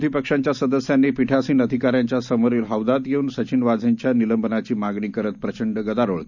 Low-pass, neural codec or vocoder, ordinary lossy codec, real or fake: 7.2 kHz; none; none; real